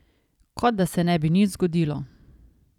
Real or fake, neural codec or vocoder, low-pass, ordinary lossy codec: fake; vocoder, 44.1 kHz, 128 mel bands every 256 samples, BigVGAN v2; 19.8 kHz; none